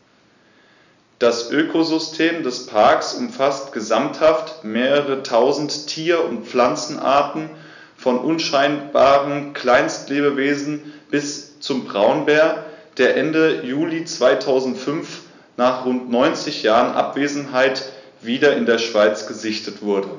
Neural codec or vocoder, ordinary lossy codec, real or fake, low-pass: none; none; real; 7.2 kHz